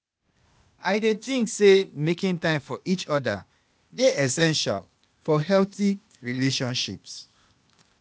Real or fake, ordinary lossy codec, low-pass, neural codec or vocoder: fake; none; none; codec, 16 kHz, 0.8 kbps, ZipCodec